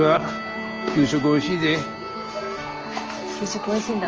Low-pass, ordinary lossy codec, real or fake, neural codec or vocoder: 7.2 kHz; Opus, 24 kbps; real; none